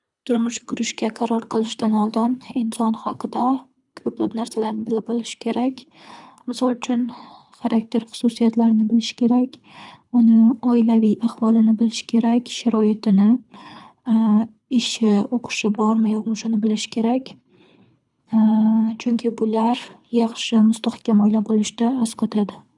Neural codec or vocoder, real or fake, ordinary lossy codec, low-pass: codec, 24 kHz, 3 kbps, HILCodec; fake; none; 10.8 kHz